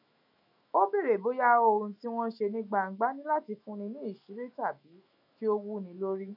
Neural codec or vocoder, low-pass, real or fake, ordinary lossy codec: autoencoder, 48 kHz, 128 numbers a frame, DAC-VAE, trained on Japanese speech; 5.4 kHz; fake; none